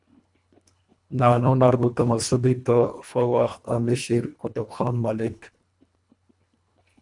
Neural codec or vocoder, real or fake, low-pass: codec, 24 kHz, 1.5 kbps, HILCodec; fake; 10.8 kHz